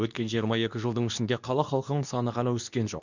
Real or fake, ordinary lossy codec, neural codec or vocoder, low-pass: fake; none; codec, 24 kHz, 0.9 kbps, WavTokenizer, medium speech release version 2; 7.2 kHz